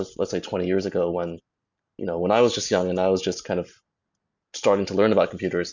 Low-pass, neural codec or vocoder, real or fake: 7.2 kHz; none; real